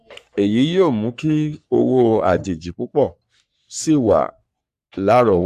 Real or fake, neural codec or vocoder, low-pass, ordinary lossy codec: fake; codec, 44.1 kHz, 3.4 kbps, Pupu-Codec; 14.4 kHz; none